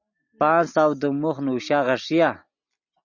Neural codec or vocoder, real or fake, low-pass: none; real; 7.2 kHz